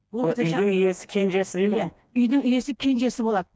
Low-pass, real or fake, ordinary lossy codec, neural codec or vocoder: none; fake; none; codec, 16 kHz, 2 kbps, FreqCodec, smaller model